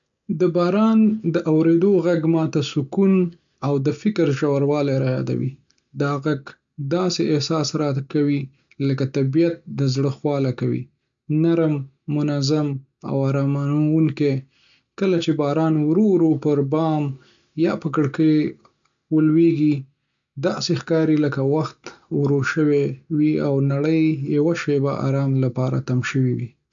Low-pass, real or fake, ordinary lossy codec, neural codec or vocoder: 7.2 kHz; real; MP3, 64 kbps; none